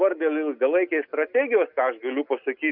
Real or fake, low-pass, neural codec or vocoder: real; 5.4 kHz; none